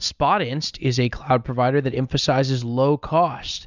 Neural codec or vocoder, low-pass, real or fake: none; 7.2 kHz; real